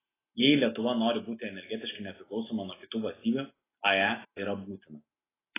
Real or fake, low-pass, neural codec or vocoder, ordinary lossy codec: real; 3.6 kHz; none; AAC, 16 kbps